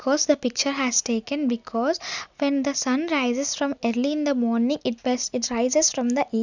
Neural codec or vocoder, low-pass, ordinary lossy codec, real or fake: none; 7.2 kHz; none; real